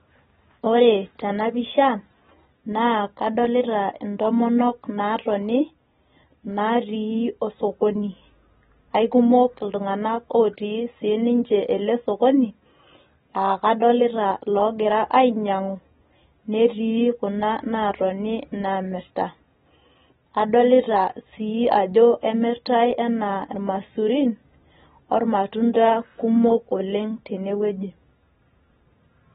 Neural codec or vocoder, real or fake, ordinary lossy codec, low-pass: none; real; AAC, 16 kbps; 7.2 kHz